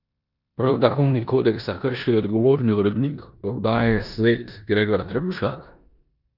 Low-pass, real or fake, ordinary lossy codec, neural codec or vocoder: 5.4 kHz; fake; none; codec, 16 kHz in and 24 kHz out, 0.9 kbps, LongCat-Audio-Codec, four codebook decoder